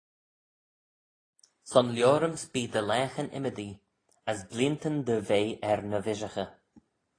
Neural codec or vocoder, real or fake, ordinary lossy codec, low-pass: none; real; AAC, 32 kbps; 9.9 kHz